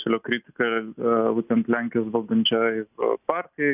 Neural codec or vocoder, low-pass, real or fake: none; 3.6 kHz; real